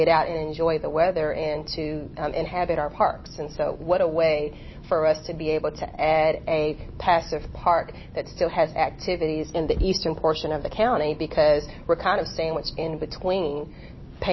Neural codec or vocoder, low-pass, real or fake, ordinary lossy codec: none; 7.2 kHz; real; MP3, 24 kbps